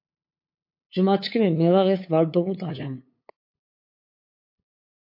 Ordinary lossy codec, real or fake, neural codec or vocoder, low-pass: MP3, 32 kbps; fake; codec, 16 kHz, 8 kbps, FunCodec, trained on LibriTTS, 25 frames a second; 5.4 kHz